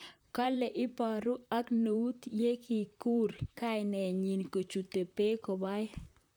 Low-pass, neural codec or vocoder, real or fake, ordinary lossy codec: none; vocoder, 44.1 kHz, 128 mel bands, Pupu-Vocoder; fake; none